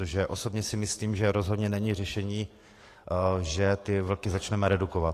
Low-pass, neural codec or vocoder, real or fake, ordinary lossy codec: 14.4 kHz; codec, 44.1 kHz, 7.8 kbps, DAC; fake; AAC, 48 kbps